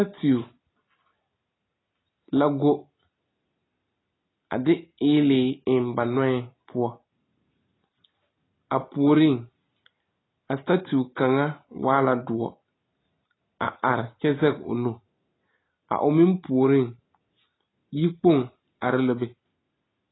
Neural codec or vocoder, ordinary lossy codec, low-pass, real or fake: none; AAC, 16 kbps; 7.2 kHz; real